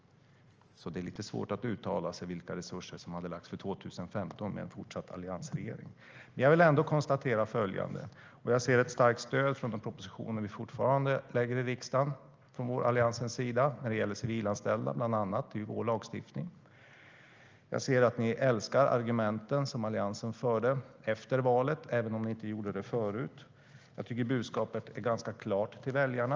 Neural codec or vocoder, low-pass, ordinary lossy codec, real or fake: none; 7.2 kHz; Opus, 24 kbps; real